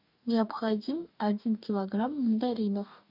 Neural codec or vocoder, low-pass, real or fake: codec, 44.1 kHz, 2.6 kbps, DAC; 5.4 kHz; fake